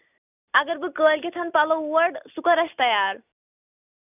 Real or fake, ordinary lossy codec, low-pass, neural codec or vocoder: real; none; 3.6 kHz; none